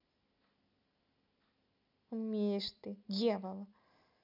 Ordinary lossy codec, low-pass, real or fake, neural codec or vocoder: none; 5.4 kHz; real; none